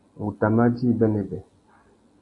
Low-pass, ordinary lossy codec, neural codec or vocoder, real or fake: 10.8 kHz; AAC, 48 kbps; none; real